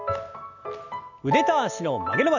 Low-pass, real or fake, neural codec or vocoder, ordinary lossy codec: 7.2 kHz; real; none; none